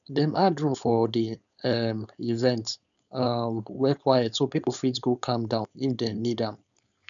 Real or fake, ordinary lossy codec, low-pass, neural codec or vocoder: fake; none; 7.2 kHz; codec, 16 kHz, 4.8 kbps, FACodec